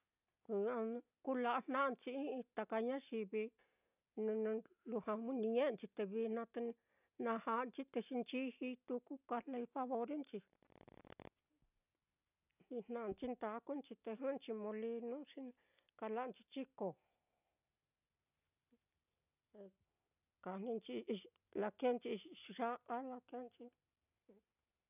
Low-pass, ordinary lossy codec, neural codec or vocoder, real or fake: 3.6 kHz; none; none; real